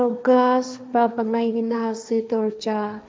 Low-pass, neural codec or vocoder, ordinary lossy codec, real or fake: none; codec, 16 kHz, 1.1 kbps, Voila-Tokenizer; none; fake